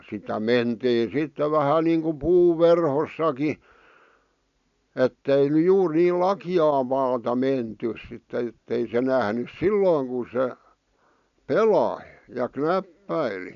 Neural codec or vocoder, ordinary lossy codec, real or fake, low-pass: none; none; real; 7.2 kHz